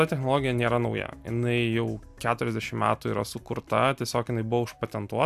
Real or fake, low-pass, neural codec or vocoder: real; 14.4 kHz; none